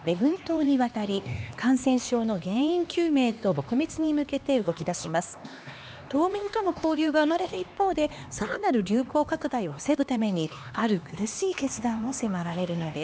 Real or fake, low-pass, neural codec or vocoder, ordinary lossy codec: fake; none; codec, 16 kHz, 2 kbps, X-Codec, HuBERT features, trained on LibriSpeech; none